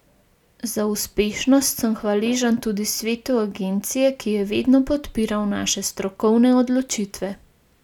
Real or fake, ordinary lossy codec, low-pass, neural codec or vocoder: real; none; 19.8 kHz; none